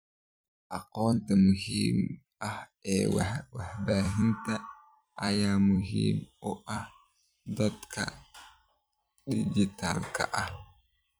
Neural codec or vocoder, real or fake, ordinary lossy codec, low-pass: vocoder, 44.1 kHz, 128 mel bands every 256 samples, BigVGAN v2; fake; none; none